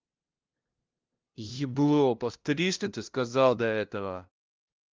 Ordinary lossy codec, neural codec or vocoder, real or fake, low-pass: Opus, 32 kbps; codec, 16 kHz, 0.5 kbps, FunCodec, trained on LibriTTS, 25 frames a second; fake; 7.2 kHz